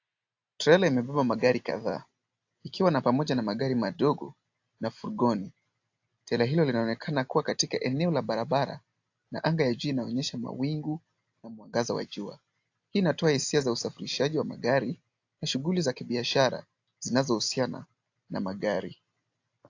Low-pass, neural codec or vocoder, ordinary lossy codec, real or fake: 7.2 kHz; none; AAC, 48 kbps; real